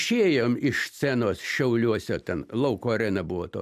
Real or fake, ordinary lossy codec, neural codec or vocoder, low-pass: real; MP3, 96 kbps; none; 14.4 kHz